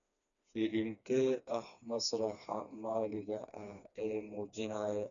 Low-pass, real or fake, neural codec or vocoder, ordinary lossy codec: 7.2 kHz; fake; codec, 16 kHz, 2 kbps, FreqCodec, smaller model; MP3, 96 kbps